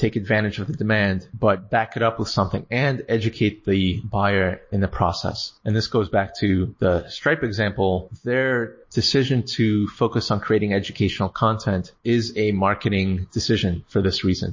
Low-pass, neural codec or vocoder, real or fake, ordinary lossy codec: 7.2 kHz; none; real; MP3, 32 kbps